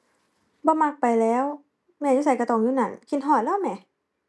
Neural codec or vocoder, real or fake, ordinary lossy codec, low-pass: none; real; none; none